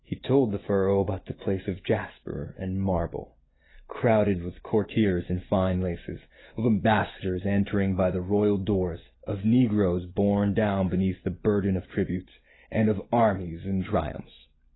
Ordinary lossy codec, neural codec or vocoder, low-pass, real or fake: AAC, 16 kbps; none; 7.2 kHz; real